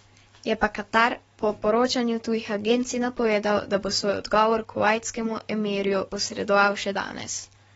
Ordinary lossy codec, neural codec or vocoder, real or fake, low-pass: AAC, 24 kbps; codec, 44.1 kHz, 7.8 kbps, DAC; fake; 19.8 kHz